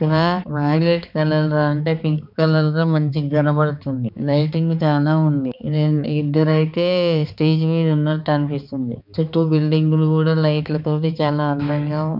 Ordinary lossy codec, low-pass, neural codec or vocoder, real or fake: none; 5.4 kHz; codec, 16 kHz, 4 kbps, X-Codec, HuBERT features, trained on balanced general audio; fake